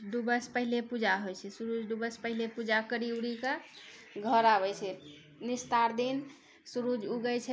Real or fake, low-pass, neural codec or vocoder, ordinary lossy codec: real; none; none; none